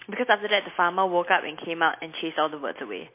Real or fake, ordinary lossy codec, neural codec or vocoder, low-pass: real; MP3, 24 kbps; none; 3.6 kHz